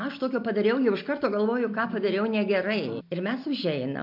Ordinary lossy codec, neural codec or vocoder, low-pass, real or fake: MP3, 48 kbps; none; 5.4 kHz; real